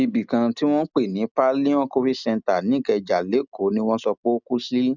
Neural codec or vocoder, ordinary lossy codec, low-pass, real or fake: none; none; 7.2 kHz; real